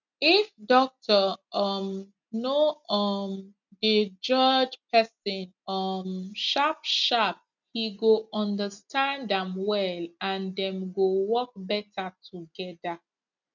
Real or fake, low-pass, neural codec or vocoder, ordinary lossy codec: real; 7.2 kHz; none; none